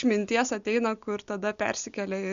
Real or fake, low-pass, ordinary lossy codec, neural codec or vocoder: real; 7.2 kHz; Opus, 64 kbps; none